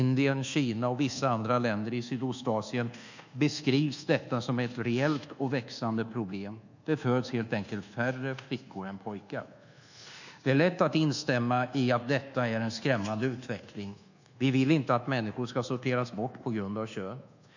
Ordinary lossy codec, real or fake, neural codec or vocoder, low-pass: none; fake; codec, 24 kHz, 1.2 kbps, DualCodec; 7.2 kHz